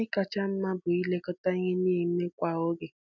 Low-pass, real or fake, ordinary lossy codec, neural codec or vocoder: 7.2 kHz; real; none; none